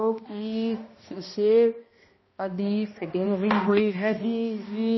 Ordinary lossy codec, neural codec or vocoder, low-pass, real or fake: MP3, 24 kbps; codec, 16 kHz, 1 kbps, X-Codec, HuBERT features, trained on balanced general audio; 7.2 kHz; fake